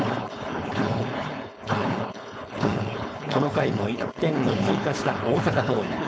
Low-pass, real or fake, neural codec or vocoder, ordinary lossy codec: none; fake; codec, 16 kHz, 4.8 kbps, FACodec; none